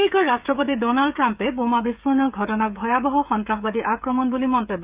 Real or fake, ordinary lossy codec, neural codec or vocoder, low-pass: fake; Opus, 64 kbps; codec, 16 kHz, 16 kbps, FreqCodec, smaller model; 3.6 kHz